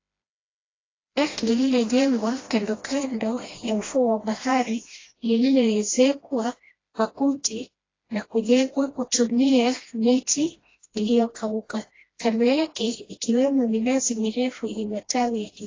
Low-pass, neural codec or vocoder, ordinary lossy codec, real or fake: 7.2 kHz; codec, 16 kHz, 1 kbps, FreqCodec, smaller model; AAC, 32 kbps; fake